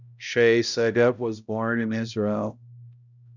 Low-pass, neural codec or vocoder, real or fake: 7.2 kHz; codec, 16 kHz, 0.5 kbps, X-Codec, HuBERT features, trained on balanced general audio; fake